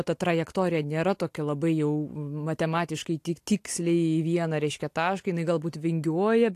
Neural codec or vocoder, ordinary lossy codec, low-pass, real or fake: none; AAC, 64 kbps; 14.4 kHz; real